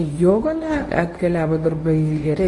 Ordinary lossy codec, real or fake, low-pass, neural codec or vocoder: AAC, 32 kbps; fake; 10.8 kHz; codec, 24 kHz, 0.9 kbps, WavTokenizer, medium speech release version 1